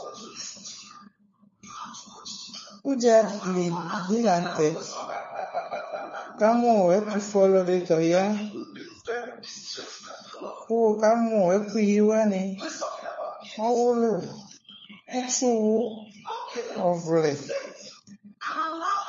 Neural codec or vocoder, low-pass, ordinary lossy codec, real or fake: codec, 16 kHz, 4 kbps, FunCodec, trained on LibriTTS, 50 frames a second; 7.2 kHz; MP3, 32 kbps; fake